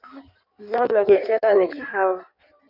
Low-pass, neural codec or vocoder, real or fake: 5.4 kHz; codec, 16 kHz in and 24 kHz out, 1.1 kbps, FireRedTTS-2 codec; fake